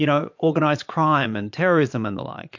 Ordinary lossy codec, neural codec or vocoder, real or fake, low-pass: MP3, 48 kbps; vocoder, 22.05 kHz, 80 mel bands, Vocos; fake; 7.2 kHz